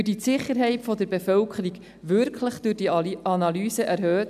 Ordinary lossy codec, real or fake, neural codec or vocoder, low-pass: none; real; none; 14.4 kHz